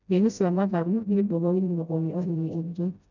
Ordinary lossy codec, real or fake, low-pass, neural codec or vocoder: none; fake; 7.2 kHz; codec, 16 kHz, 0.5 kbps, FreqCodec, smaller model